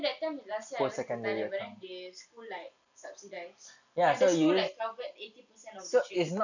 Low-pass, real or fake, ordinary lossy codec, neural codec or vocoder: 7.2 kHz; real; none; none